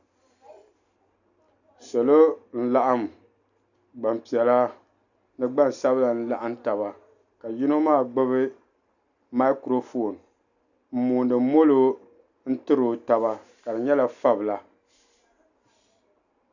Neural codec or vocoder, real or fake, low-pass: none; real; 7.2 kHz